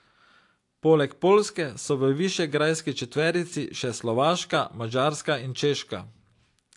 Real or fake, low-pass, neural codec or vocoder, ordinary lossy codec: real; 10.8 kHz; none; AAC, 64 kbps